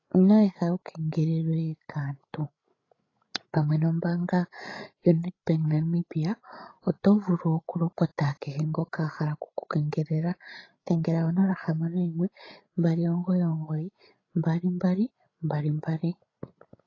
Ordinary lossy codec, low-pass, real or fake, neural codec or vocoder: AAC, 32 kbps; 7.2 kHz; fake; codec, 16 kHz, 16 kbps, FreqCodec, larger model